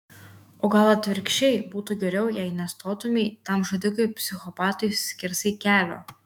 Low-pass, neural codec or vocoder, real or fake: 19.8 kHz; autoencoder, 48 kHz, 128 numbers a frame, DAC-VAE, trained on Japanese speech; fake